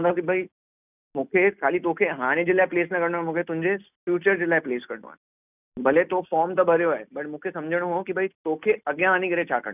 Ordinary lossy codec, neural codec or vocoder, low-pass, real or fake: none; none; 3.6 kHz; real